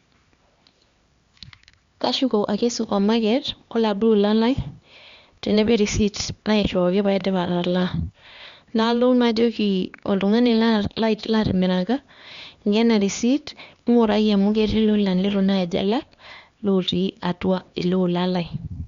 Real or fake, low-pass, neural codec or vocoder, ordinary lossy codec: fake; 7.2 kHz; codec, 16 kHz, 2 kbps, X-Codec, HuBERT features, trained on LibriSpeech; Opus, 64 kbps